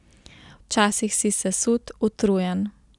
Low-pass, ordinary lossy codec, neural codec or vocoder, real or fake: 10.8 kHz; none; none; real